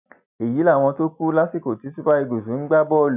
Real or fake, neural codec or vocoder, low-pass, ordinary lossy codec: real; none; 3.6 kHz; none